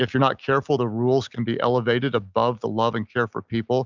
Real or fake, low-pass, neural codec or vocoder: real; 7.2 kHz; none